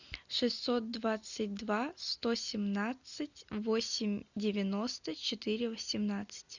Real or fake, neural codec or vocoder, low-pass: real; none; 7.2 kHz